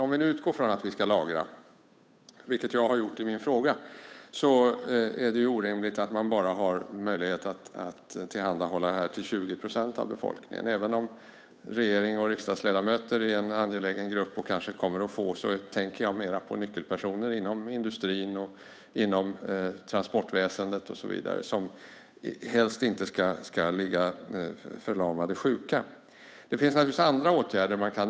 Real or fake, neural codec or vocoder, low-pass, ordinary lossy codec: fake; codec, 16 kHz, 8 kbps, FunCodec, trained on Chinese and English, 25 frames a second; none; none